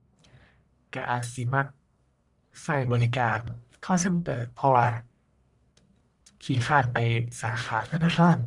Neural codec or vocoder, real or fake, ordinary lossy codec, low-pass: codec, 44.1 kHz, 1.7 kbps, Pupu-Codec; fake; none; 10.8 kHz